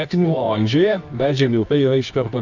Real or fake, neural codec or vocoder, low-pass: fake; codec, 24 kHz, 0.9 kbps, WavTokenizer, medium music audio release; 7.2 kHz